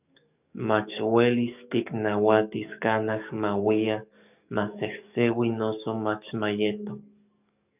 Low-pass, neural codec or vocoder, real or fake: 3.6 kHz; codec, 44.1 kHz, 7.8 kbps, DAC; fake